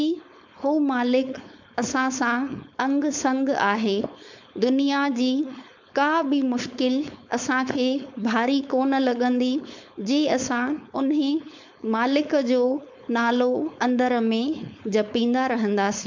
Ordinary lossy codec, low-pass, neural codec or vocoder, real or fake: MP3, 64 kbps; 7.2 kHz; codec, 16 kHz, 4.8 kbps, FACodec; fake